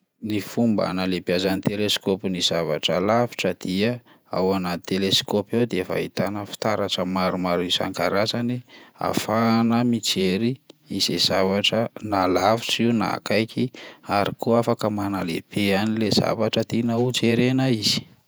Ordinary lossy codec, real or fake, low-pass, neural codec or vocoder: none; fake; none; vocoder, 48 kHz, 128 mel bands, Vocos